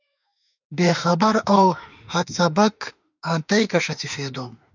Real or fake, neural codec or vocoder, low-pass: fake; autoencoder, 48 kHz, 32 numbers a frame, DAC-VAE, trained on Japanese speech; 7.2 kHz